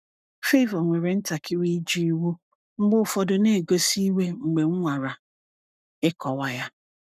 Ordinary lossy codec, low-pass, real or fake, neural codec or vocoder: none; 14.4 kHz; fake; codec, 44.1 kHz, 7.8 kbps, Pupu-Codec